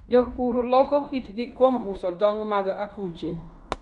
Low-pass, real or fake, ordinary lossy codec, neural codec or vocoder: 10.8 kHz; fake; none; codec, 16 kHz in and 24 kHz out, 0.9 kbps, LongCat-Audio-Codec, fine tuned four codebook decoder